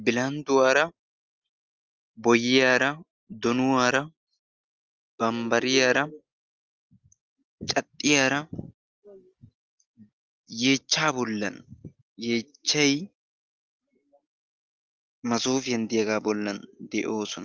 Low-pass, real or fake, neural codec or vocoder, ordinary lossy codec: 7.2 kHz; real; none; Opus, 24 kbps